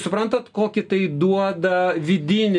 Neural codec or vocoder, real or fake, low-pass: none; real; 10.8 kHz